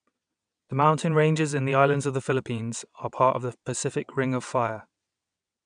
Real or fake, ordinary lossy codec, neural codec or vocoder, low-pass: fake; none; vocoder, 22.05 kHz, 80 mel bands, WaveNeXt; 9.9 kHz